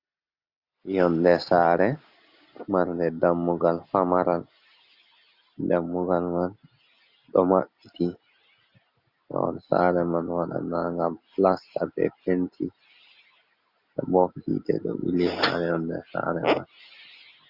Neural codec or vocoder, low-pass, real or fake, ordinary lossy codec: none; 5.4 kHz; real; Opus, 64 kbps